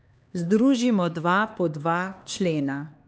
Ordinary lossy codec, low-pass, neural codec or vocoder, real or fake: none; none; codec, 16 kHz, 2 kbps, X-Codec, HuBERT features, trained on LibriSpeech; fake